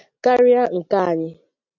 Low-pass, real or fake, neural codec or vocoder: 7.2 kHz; real; none